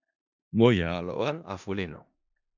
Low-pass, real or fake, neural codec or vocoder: 7.2 kHz; fake; codec, 16 kHz in and 24 kHz out, 0.4 kbps, LongCat-Audio-Codec, four codebook decoder